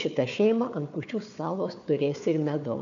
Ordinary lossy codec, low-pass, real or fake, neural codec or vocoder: MP3, 96 kbps; 7.2 kHz; fake; codec, 16 kHz, 4 kbps, FunCodec, trained on Chinese and English, 50 frames a second